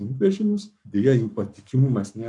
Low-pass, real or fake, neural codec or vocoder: 10.8 kHz; fake; codec, 44.1 kHz, 7.8 kbps, Pupu-Codec